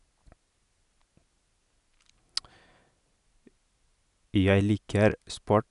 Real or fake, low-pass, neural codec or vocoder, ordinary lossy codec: real; 10.8 kHz; none; none